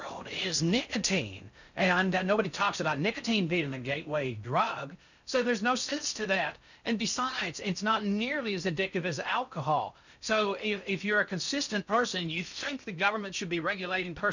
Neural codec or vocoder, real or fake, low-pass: codec, 16 kHz in and 24 kHz out, 0.6 kbps, FocalCodec, streaming, 4096 codes; fake; 7.2 kHz